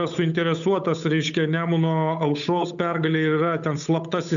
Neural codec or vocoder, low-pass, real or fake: codec, 16 kHz, 8 kbps, FunCodec, trained on Chinese and English, 25 frames a second; 7.2 kHz; fake